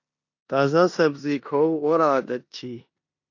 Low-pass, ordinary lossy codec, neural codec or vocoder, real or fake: 7.2 kHz; AAC, 48 kbps; codec, 16 kHz in and 24 kHz out, 0.9 kbps, LongCat-Audio-Codec, four codebook decoder; fake